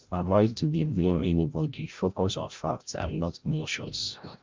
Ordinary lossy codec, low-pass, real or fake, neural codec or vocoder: Opus, 24 kbps; 7.2 kHz; fake; codec, 16 kHz, 0.5 kbps, FreqCodec, larger model